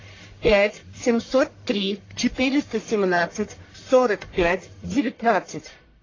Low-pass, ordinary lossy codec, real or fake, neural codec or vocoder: 7.2 kHz; AAC, 32 kbps; fake; codec, 44.1 kHz, 1.7 kbps, Pupu-Codec